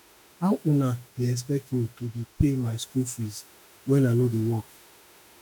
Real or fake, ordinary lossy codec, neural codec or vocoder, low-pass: fake; none; autoencoder, 48 kHz, 32 numbers a frame, DAC-VAE, trained on Japanese speech; none